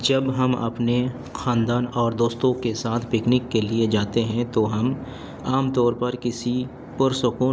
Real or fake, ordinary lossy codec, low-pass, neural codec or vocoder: real; none; none; none